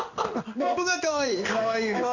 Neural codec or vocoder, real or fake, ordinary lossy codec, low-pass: codec, 16 kHz in and 24 kHz out, 1 kbps, XY-Tokenizer; fake; none; 7.2 kHz